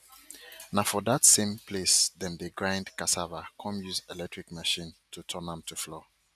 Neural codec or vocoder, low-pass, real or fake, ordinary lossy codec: none; 14.4 kHz; real; none